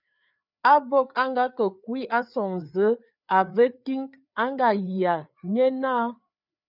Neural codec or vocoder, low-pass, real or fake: codec, 16 kHz, 4 kbps, FreqCodec, larger model; 5.4 kHz; fake